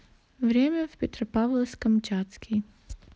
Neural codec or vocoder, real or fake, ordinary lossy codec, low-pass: none; real; none; none